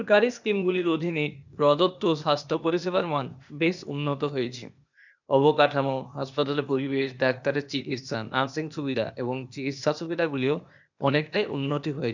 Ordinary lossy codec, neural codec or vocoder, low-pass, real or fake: none; codec, 16 kHz, 0.8 kbps, ZipCodec; 7.2 kHz; fake